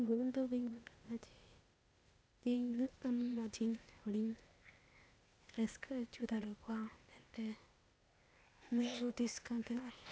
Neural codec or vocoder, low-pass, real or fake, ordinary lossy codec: codec, 16 kHz, 0.8 kbps, ZipCodec; none; fake; none